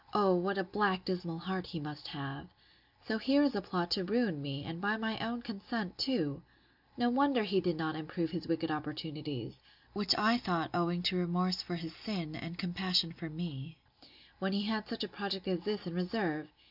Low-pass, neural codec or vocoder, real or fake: 5.4 kHz; none; real